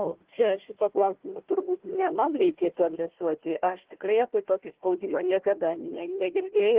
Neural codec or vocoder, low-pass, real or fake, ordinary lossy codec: codec, 16 kHz, 1 kbps, FunCodec, trained on Chinese and English, 50 frames a second; 3.6 kHz; fake; Opus, 16 kbps